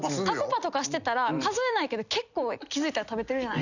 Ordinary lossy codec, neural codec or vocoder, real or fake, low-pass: none; none; real; 7.2 kHz